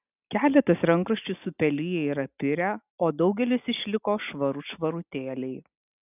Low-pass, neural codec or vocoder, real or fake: 3.6 kHz; none; real